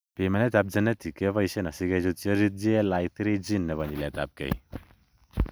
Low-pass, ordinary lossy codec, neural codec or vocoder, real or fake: none; none; none; real